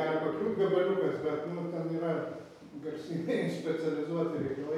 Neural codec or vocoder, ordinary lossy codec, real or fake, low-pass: none; MP3, 96 kbps; real; 19.8 kHz